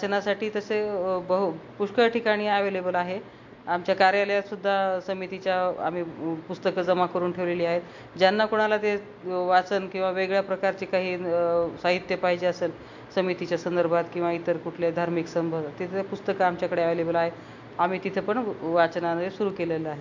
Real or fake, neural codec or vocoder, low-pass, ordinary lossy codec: real; none; 7.2 kHz; MP3, 48 kbps